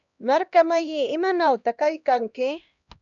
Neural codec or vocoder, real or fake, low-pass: codec, 16 kHz, 1 kbps, X-Codec, HuBERT features, trained on LibriSpeech; fake; 7.2 kHz